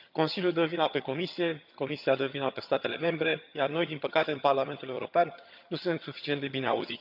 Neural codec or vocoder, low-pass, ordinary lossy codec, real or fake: vocoder, 22.05 kHz, 80 mel bands, HiFi-GAN; 5.4 kHz; none; fake